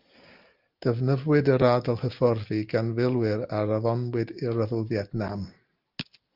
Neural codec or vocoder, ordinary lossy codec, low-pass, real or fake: none; Opus, 32 kbps; 5.4 kHz; real